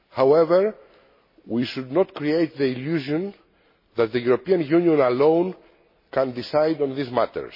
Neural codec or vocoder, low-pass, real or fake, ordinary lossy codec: none; 5.4 kHz; real; MP3, 24 kbps